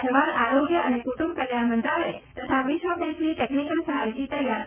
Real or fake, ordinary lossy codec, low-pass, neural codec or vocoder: fake; none; 3.6 kHz; vocoder, 22.05 kHz, 80 mel bands, WaveNeXt